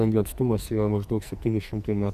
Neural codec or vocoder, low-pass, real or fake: codec, 44.1 kHz, 2.6 kbps, SNAC; 14.4 kHz; fake